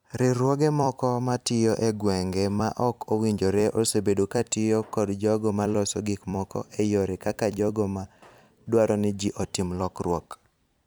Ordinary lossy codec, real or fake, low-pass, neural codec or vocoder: none; fake; none; vocoder, 44.1 kHz, 128 mel bands every 256 samples, BigVGAN v2